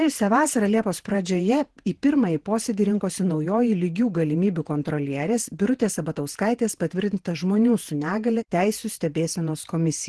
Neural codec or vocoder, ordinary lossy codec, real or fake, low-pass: vocoder, 48 kHz, 128 mel bands, Vocos; Opus, 16 kbps; fake; 10.8 kHz